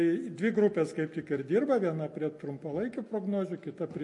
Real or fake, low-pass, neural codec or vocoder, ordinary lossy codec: real; 10.8 kHz; none; MP3, 48 kbps